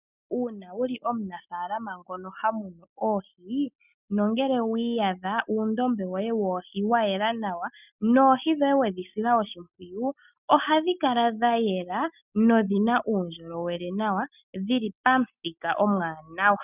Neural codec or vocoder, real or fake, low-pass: none; real; 3.6 kHz